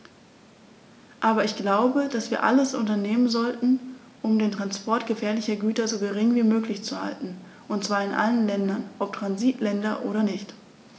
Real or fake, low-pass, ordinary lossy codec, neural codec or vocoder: real; none; none; none